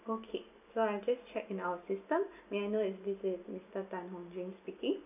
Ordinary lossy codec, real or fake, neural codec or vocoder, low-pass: none; real; none; 3.6 kHz